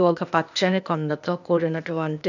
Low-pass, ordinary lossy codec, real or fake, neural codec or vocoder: 7.2 kHz; none; fake; codec, 16 kHz, 0.8 kbps, ZipCodec